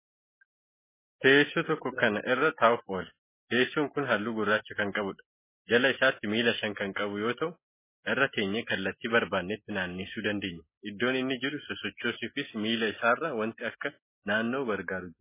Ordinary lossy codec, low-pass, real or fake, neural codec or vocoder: MP3, 16 kbps; 3.6 kHz; real; none